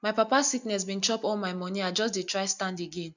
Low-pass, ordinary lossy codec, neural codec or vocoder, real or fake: 7.2 kHz; none; none; real